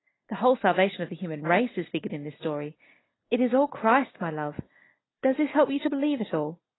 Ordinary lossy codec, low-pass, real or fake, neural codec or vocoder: AAC, 16 kbps; 7.2 kHz; real; none